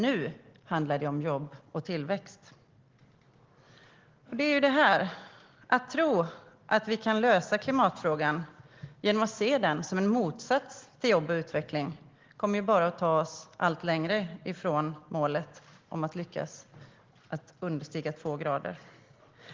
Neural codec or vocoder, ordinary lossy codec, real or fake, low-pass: none; Opus, 16 kbps; real; 7.2 kHz